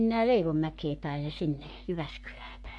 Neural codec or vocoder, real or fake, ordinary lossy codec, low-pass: codec, 44.1 kHz, 3.4 kbps, Pupu-Codec; fake; MP3, 64 kbps; 10.8 kHz